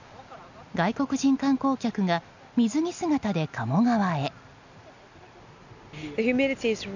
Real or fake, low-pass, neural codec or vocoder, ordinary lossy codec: real; 7.2 kHz; none; none